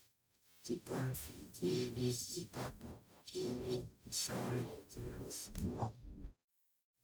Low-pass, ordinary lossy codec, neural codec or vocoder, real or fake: none; none; codec, 44.1 kHz, 0.9 kbps, DAC; fake